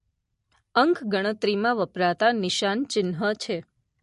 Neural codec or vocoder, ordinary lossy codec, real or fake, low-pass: none; MP3, 48 kbps; real; 14.4 kHz